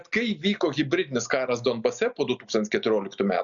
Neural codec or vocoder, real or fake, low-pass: none; real; 7.2 kHz